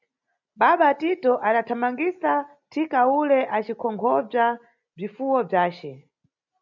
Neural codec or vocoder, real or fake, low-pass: none; real; 7.2 kHz